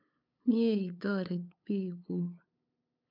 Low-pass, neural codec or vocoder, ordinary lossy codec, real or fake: 5.4 kHz; codec, 16 kHz, 8 kbps, FunCodec, trained on LibriTTS, 25 frames a second; none; fake